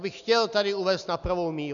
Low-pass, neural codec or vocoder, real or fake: 7.2 kHz; none; real